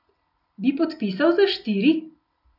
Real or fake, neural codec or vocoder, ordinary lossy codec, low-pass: real; none; none; 5.4 kHz